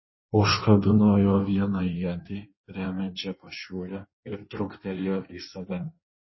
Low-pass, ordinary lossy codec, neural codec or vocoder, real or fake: 7.2 kHz; MP3, 24 kbps; codec, 16 kHz in and 24 kHz out, 1.1 kbps, FireRedTTS-2 codec; fake